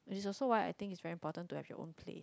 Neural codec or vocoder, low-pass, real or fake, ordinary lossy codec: none; none; real; none